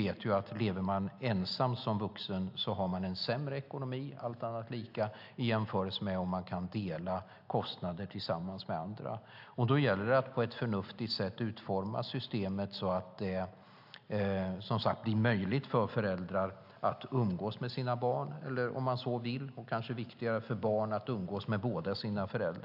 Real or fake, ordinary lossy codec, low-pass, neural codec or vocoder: real; AAC, 48 kbps; 5.4 kHz; none